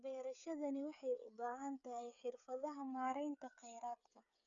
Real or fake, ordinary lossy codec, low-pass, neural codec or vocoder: fake; none; 7.2 kHz; codec, 16 kHz, 8 kbps, FreqCodec, smaller model